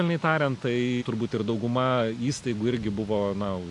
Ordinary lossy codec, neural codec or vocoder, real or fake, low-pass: AAC, 64 kbps; none; real; 10.8 kHz